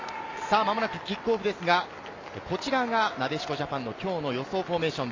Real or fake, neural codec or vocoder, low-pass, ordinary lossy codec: real; none; 7.2 kHz; MP3, 64 kbps